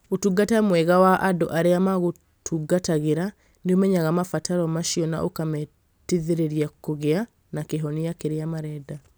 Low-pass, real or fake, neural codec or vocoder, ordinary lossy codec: none; real; none; none